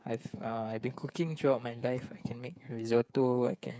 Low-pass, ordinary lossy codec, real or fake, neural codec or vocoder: none; none; fake; codec, 16 kHz, 8 kbps, FreqCodec, smaller model